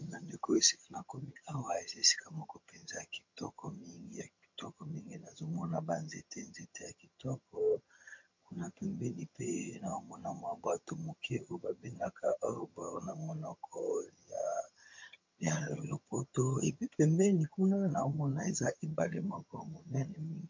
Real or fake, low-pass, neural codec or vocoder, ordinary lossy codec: fake; 7.2 kHz; vocoder, 22.05 kHz, 80 mel bands, HiFi-GAN; MP3, 48 kbps